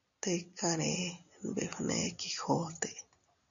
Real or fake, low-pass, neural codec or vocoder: real; 7.2 kHz; none